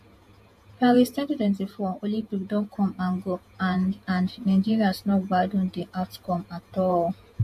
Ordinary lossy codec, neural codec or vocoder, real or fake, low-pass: MP3, 64 kbps; vocoder, 48 kHz, 128 mel bands, Vocos; fake; 14.4 kHz